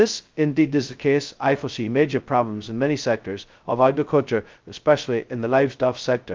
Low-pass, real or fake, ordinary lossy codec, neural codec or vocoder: 7.2 kHz; fake; Opus, 24 kbps; codec, 16 kHz, 0.2 kbps, FocalCodec